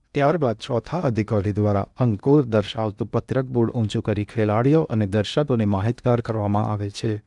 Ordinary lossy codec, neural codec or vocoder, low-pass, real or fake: none; codec, 16 kHz in and 24 kHz out, 0.8 kbps, FocalCodec, streaming, 65536 codes; 10.8 kHz; fake